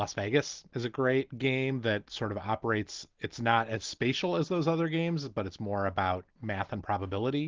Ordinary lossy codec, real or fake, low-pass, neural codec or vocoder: Opus, 16 kbps; real; 7.2 kHz; none